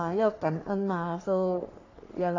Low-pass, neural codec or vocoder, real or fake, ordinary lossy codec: 7.2 kHz; codec, 44.1 kHz, 3.4 kbps, Pupu-Codec; fake; AAC, 32 kbps